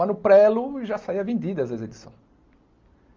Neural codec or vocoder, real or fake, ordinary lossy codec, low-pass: none; real; Opus, 24 kbps; 7.2 kHz